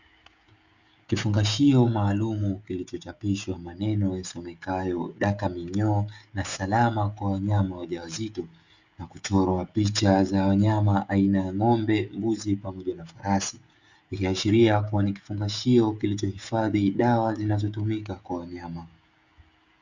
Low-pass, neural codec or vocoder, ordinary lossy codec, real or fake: 7.2 kHz; codec, 16 kHz, 16 kbps, FreqCodec, smaller model; Opus, 64 kbps; fake